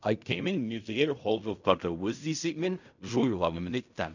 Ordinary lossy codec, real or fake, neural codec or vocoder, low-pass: none; fake; codec, 16 kHz in and 24 kHz out, 0.4 kbps, LongCat-Audio-Codec, fine tuned four codebook decoder; 7.2 kHz